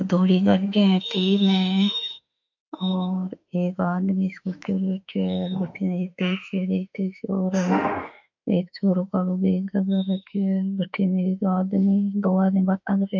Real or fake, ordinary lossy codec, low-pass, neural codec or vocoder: fake; MP3, 64 kbps; 7.2 kHz; autoencoder, 48 kHz, 32 numbers a frame, DAC-VAE, trained on Japanese speech